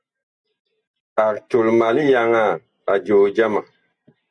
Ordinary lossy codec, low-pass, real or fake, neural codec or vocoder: Opus, 64 kbps; 9.9 kHz; real; none